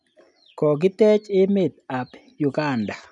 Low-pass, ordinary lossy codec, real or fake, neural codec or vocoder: 10.8 kHz; none; real; none